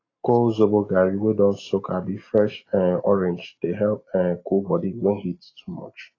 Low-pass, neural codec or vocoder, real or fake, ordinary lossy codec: 7.2 kHz; none; real; AAC, 32 kbps